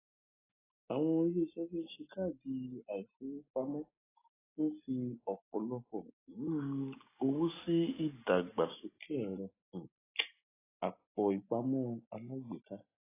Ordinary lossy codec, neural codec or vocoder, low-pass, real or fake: AAC, 16 kbps; none; 3.6 kHz; real